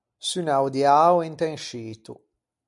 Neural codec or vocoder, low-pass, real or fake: none; 10.8 kHz; real